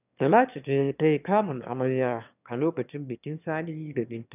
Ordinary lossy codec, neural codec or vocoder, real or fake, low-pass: none; autoencoder, 22.05 kHz, a latent of 192 numbers a frame, VITS, trained on one speaker; fake; 3.6 kHz